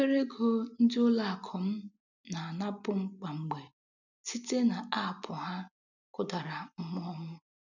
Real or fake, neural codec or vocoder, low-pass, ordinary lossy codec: real; none; 7.2 kHz; none